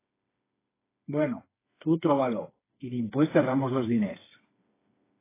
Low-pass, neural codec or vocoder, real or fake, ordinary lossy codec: 3.6 kHz; codec, 16 kHz, 4 kbps, FreqCodec, smaller model; fake; AAC, 16 kbps